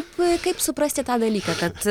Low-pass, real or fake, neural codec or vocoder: 19.8 kHz; real; none